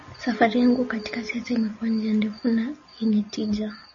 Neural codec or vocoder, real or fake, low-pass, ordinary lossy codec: none; real; 7.2 kHz; MP3, 32 kbps